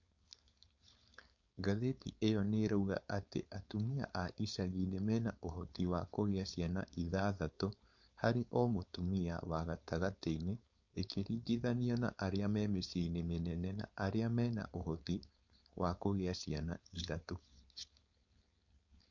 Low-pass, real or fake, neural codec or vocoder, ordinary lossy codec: 7.2 kHz; fake; codec, 16 kHz, 4.8 kbps, FACodec; MP3, 48 kbps